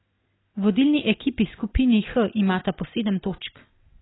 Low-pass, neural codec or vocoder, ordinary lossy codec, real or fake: 7.2 kHz; none; AAC, 16 kbps; real